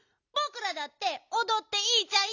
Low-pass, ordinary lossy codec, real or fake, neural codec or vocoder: 7.2 kHz; none; real; none